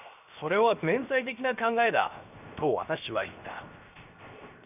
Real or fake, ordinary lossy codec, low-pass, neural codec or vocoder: fake; none; 3.6 kHz; codec, 16 kHz, 0.7 kbps, FocalCodec